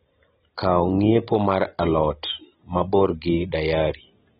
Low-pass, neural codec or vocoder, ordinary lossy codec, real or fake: 19.8 kHz; none; AAC, 16 kbps; real